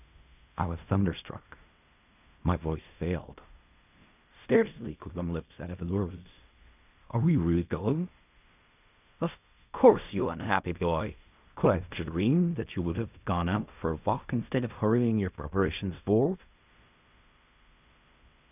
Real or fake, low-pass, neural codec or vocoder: fake; 3.6 kHz; codec, 16 kHz in and 24 kHz out, 0.4 kbps, LongCat-Audio-Codec, fine tuned four codebook decoder